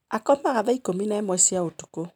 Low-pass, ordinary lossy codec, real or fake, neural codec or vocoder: none; none; real; none